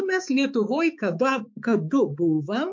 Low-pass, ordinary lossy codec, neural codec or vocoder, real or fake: 7.2 kHz; MP3, 48 kbps; codec, 16 kHz, 4 kbps, X-Codec, HuBERT features, trained on balanced general audio; fake